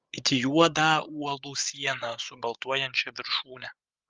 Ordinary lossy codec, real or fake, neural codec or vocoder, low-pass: Opus, 32 kbps; fake; codec, 16 kHz, 8 kbps, FreqCodec, larger model; 7.2 kHz